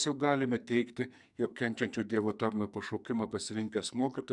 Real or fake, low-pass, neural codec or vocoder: fake; 10.8 kHz; codec, 44.1 kHz, 2.6 kbps, SNAC